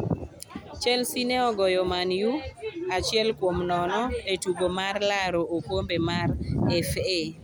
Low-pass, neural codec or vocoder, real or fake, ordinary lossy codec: none; none; real; none